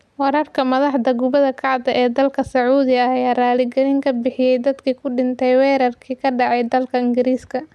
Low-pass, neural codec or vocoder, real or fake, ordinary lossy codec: none; none; real; none